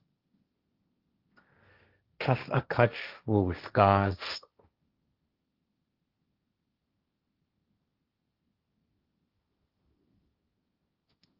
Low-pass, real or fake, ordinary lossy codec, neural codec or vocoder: 5.4 kHz; fake; Opus, 24 kbps; codec, 16 kHz, 1.1 kbps, Voila-Tokenizer